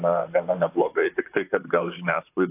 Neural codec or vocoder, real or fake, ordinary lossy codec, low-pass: vocoder, 44.1 kHz, 128 mel bands, Pupu-Vocoder; fake; AAC, 24 kbps; 3.6 kHz